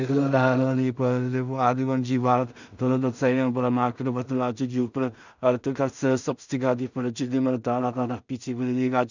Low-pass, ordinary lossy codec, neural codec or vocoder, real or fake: 7.2 kHz; none; codec, 16 kHz in and 24 kHz out, 0.4 kbps, LongCat-Audio-Codec, two codebook decoder; fake